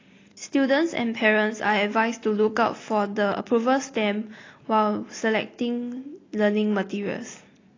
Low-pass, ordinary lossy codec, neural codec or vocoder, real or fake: 7.2 kHz; AAC, 32 kbps; none; real